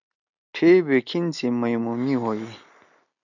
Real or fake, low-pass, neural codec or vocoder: real; 7.2 kHz; none